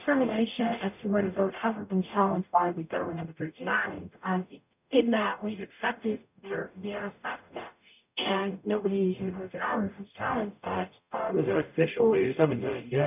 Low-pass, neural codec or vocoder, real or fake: 3.6 kHz; codec, 44.1 kHz, 0.9 kbps, DAC; fake